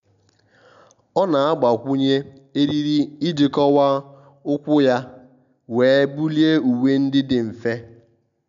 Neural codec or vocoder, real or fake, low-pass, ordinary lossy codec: none; real; 7.2 kHz; none